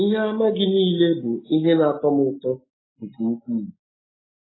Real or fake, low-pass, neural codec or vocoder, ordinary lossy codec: real; 7.2 kHz; none; AAC, 16 kbps